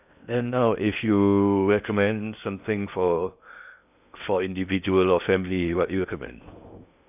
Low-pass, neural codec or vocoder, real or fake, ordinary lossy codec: 3.6 kHz; codec, 16 kHz in and 24 kHz out, 0.6 kbps, FocalCodec, streaming, 2048 codes; fake; none